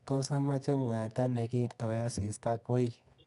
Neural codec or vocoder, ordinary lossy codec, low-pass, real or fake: codec, 24 kHz, 0.9 kbps, WavTokenizer, medium music audio release; none; 10.8 kHz; fake